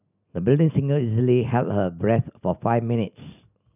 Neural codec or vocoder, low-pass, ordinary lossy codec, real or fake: none; 3.6 kHz; none; real